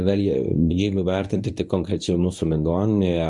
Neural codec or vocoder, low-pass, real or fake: codec, 24 kHz, 0.9 kbps, WavTokenizer, medium speech release version 1; 10.8 kHz; fake